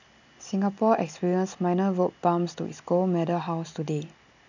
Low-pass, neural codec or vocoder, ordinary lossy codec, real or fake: 7.2 kHz; none; none; real